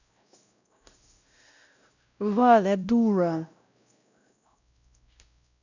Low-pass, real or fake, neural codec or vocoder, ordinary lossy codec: 7.2 kHz; fake; codec, 16 kHz, 0.5 kbps, X-Codec, WavLM features, trained on Multilingual LibriSpeech; none